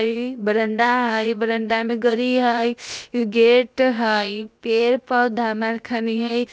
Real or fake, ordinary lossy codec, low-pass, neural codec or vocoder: fake; none; none; codec, 16 kHz, 0.7 kbps, FocalCodec